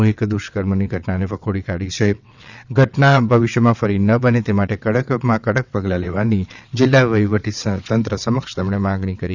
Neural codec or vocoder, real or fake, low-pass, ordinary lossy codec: vocoder, 22.05 kHz, 80 mel bands, WaveNeXt; fake; 7.2 kHz; none